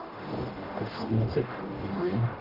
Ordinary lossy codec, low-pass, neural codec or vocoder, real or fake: Opus, 16 kbps; 5.4 kHz; codec, 44.1 kHz, 0.9 kbps, DAC; fake